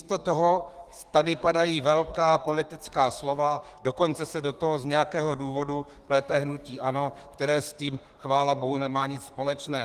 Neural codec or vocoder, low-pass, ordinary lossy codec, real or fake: codec, 32 kHz, 1.9 kbps, SNAC; 14.4 kHz; Opus, 24 kbps; fake